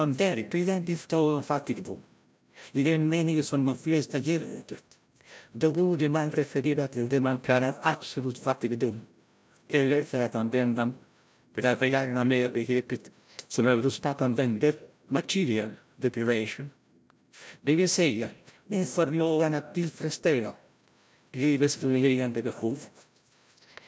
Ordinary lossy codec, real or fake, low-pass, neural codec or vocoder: none; fake; none; codec, 16 kHz, 0.5 kbps, FreqCodec, larger model